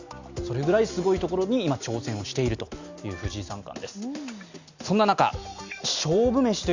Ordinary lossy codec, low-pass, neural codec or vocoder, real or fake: Opus, 64 kbps; 7.2 kHz; none; real